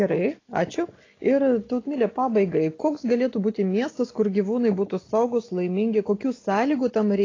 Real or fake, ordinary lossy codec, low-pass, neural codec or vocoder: real; AAC, 32 kbps; 7.2 kHz; none